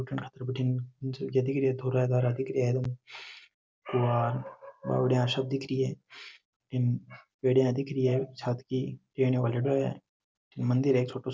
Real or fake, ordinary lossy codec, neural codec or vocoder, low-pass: real; none; none; none